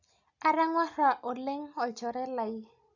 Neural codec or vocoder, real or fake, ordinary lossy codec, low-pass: none; real; none; 7.2 kHz